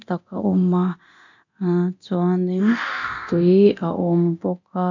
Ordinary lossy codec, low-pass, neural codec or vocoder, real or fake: none; 7.2 kHz; codec, 24 kHz, 0.9 kbps, DualCodec; fake